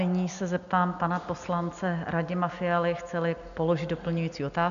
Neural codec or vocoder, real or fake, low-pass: none; real; 7.2 kHz